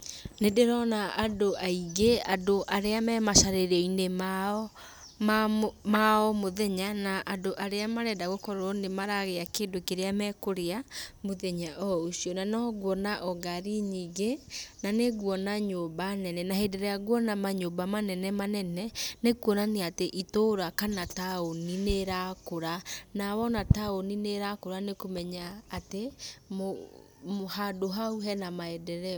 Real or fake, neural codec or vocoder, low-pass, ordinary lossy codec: real; none; none; none